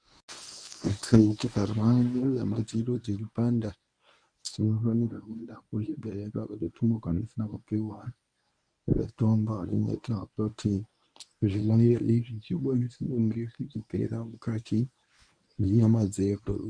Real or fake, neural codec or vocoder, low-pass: fake; codec, 24 kHz, 0.9 kbps, WavTokenizer, medium speech release version 1; 9.9 kHz